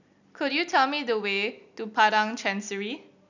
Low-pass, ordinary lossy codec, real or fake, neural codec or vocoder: 7.2 kHz; none; real; none